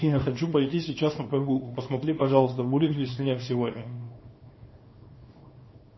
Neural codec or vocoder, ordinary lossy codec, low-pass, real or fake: codec, 24 kHz, 0.9 kbps, WavTokenizer, small release; MP3, 24 kbps; 7.2 kHz; fake